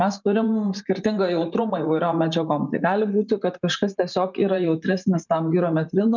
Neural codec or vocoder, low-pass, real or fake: vocoder, 44.1 kHz, 128 mel bands every 512 samples, BigVGAN v2; 7.2 kHz; fake